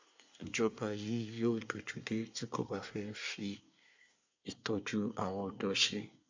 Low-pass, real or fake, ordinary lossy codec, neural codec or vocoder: 7.2 kHz; fake; MP3, 64 kbps; codec, 24 kHz, 1 kbps, SNAC